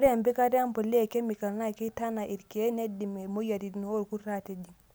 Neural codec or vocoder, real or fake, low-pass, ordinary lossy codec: none; real; none; none